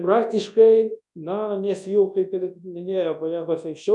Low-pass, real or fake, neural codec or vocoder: 10.8 kHz; fake; codec, 24 kHz, 0.9 kbps, WavTokenizer, large speech release